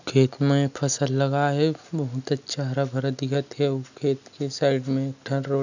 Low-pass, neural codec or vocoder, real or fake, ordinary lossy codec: 7.2 kHz; none; real; none